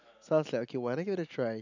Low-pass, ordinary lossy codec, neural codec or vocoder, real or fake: 7.2 kHz; none; none; real